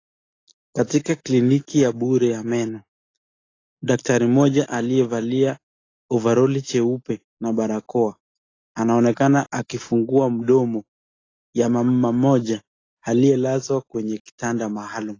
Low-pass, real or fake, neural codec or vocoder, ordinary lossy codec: 7.2 kHz; real; none; AAC, 32 kbps